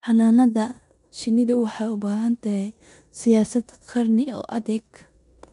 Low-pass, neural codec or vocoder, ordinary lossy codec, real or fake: 10.8 kHz; codec, 16 kHz in and 24 kHz out, 0.9 kbps, LongCat-Audio-Codec, four codebook decoder; none; fake